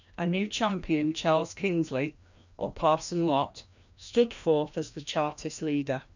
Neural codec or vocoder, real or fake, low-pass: codec, 16 kHz, 1 kbps, FreqCodec, larger model; fake; 7.2 kHz